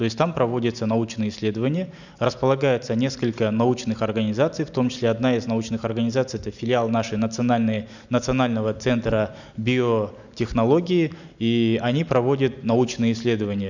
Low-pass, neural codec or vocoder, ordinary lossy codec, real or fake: 7.2 kHz; none; none; real